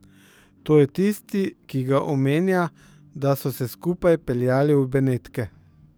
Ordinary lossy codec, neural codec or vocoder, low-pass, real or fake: none; codec, 44.1 kHz, 7.8 kbps, DAC; none; fake